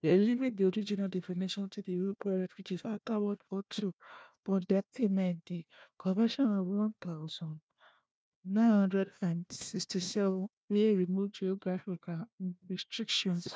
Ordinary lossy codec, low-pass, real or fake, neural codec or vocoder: none; none; fake; codec, 16 kHz, 1 kbps, FunCodec, trained on Chinese and English, 50 frames a second